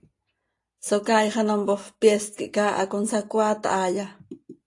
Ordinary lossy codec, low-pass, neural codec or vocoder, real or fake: AAC, 48 kbps; 10.8 kHz; none; real